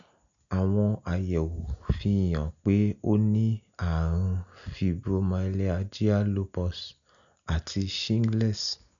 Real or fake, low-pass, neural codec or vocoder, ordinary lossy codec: real; 7.2 kHz; none; none